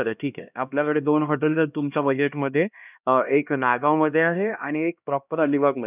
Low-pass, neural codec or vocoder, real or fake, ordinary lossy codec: 3.6 kHz; codec, 16 kHz, 1 kbps, X-Codec, HuBERT features, trained on LibriSpeech; fake; none